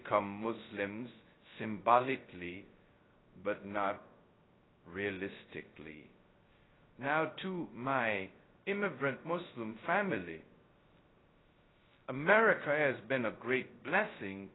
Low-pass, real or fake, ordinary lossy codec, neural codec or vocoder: 7.2 kHz; fake; AAC, 16 kbps; codec, 16 kHz, 0.2 kbps, FocalCodec